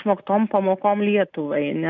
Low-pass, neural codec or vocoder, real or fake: 7.2 kHz; none; real